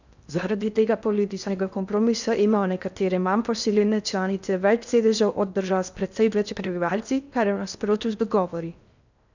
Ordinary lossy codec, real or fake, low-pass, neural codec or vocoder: none; fake; 7.2 kHz; codec, 16 kHz in and 24 kHz out, 0.6 kbps, FocalCodec, streaming, 4096 codes